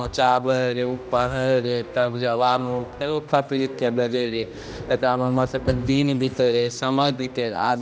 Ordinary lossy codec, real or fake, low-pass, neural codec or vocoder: none; fake; none; codec, 16 kHz, 1 kbps, X-Codec, HuBERT features, trained on general audio